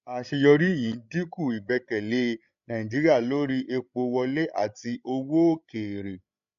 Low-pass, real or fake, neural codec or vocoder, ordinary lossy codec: 7.2 kHz; fake; codec, 16 kHz, 16 kbps, FreqCodec, larger model; AAC, 96 kbps